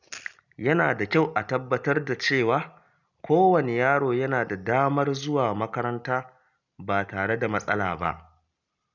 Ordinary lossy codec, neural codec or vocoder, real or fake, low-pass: none; none; real; 7.2 kHz